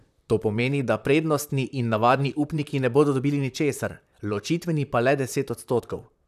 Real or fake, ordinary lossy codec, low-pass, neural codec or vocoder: fake; none; 14.4 kHz; vocoder, 44.1 kHz, 128 mel bands, Pupu-Vocoder